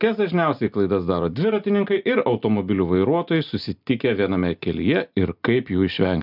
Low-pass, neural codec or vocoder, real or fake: 5.4 kHz; none; real